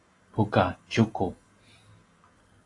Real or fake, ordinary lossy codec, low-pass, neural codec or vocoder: real; AAC, 32 kbps; 10.8 kHz; none